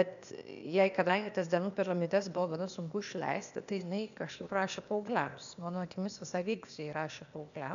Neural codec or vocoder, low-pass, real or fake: codec, 16 kHz, 0.8 kbps, ZipCodec; 7.2 kHz; fake